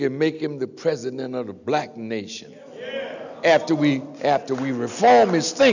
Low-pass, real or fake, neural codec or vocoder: 7.2 kHz; real; none